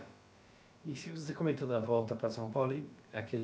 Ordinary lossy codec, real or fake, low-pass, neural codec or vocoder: none; fake; none; codec, 16 kHz, about 1 kbps, DyCAST, with the encoder's durations